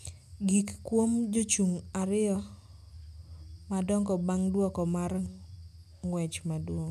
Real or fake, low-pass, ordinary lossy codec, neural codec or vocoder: real; 14.4 kHz; none; none